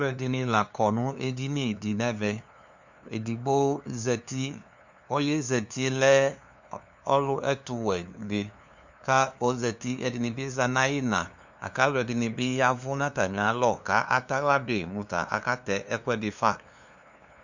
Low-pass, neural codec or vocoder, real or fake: 7.2 kHz; codec, 16 kHz, 2 kbps, FunCodec, trained on LibriTTS, 25 frames a second; fake